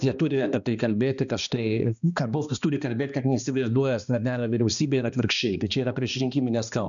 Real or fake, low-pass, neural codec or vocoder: fake; 7.2 kHz; codec, 16 kHz, 2 kbps, X-Codec, HuBERT features, trained on balanced general audio